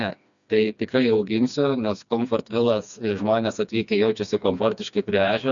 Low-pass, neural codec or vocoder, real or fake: 7.2 kHz; codec, 16 kHz, 2 kbps, FreqCodec, smaller model; fake